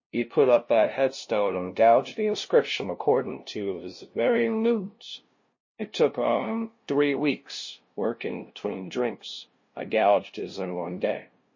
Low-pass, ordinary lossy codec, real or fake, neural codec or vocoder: 7.2 kHz; MP3, 32 kbps; fake; codec, 16 kHz, 0.5 kbps, FunCodec, trained on LibriTTS, 25 frames a second